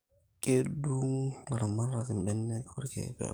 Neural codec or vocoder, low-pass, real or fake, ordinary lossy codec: codec, 44.1 kHz, 7.8 kbps, DAC; none; fake; none